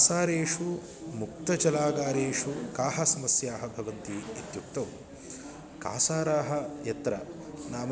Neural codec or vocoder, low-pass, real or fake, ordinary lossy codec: none; none; real; none